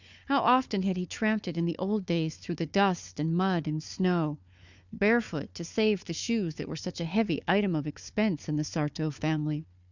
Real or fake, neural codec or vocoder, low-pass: fake; codec, 16 kHz, 4 kbps, FunCodec, trained on Chinese and English, 50 frames a second; 7.2 kHz